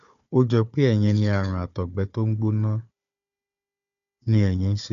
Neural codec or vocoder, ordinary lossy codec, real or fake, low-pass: codec, 16 kHz, 4 kbps, FunCodec, trained on Chinese and English, 50 frames a second; none; fake; 7.2 kHz